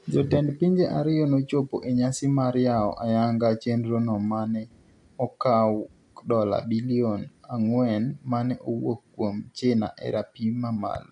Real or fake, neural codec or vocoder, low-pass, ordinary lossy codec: real; none; 10.8 kHz; AAC, 64 kbps